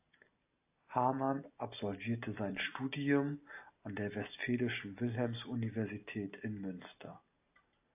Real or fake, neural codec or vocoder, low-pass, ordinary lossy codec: real; none; 3.6 kHz; AAC, 32 kbps